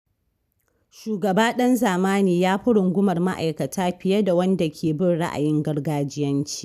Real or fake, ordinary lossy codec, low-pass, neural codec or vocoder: real; none; 14.4 kHz; none